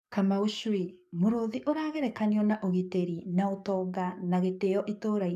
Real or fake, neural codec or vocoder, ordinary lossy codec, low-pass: fake; codec, 44.1 kHz, 7.8 kbps, DAC; none; 14.4 kHz